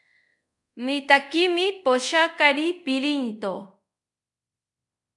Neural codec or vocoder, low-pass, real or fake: codec, 24 kHz, 0.5 kbps, DualCodec; 10.8 kHz; fake